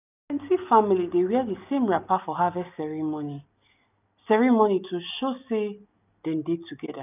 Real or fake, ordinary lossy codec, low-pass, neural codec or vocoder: real; none; 3.6 kHz; none